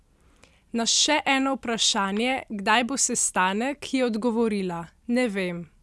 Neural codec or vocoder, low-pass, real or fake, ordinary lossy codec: none; none; real; none